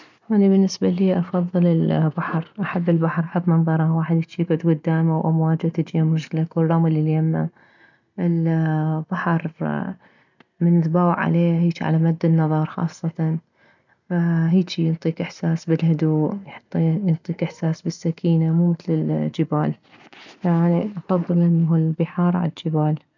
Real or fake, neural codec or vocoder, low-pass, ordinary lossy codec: real; none; 7.2 kHz; none